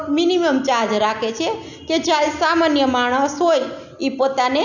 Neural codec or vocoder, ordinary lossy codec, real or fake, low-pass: none; none; real; 7.2 kHz